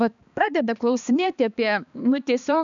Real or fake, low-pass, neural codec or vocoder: fake; 7.2 kHz; codec, 16 kHz, 2 kbps, X-Codec, HuBERT features, trained on balanced general audio